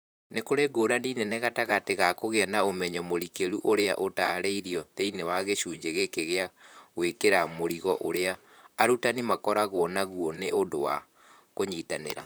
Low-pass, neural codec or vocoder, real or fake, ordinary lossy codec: none; vocoder, 44.1 kHz, 128 mel bands, Pupu-Vocoder; fake; none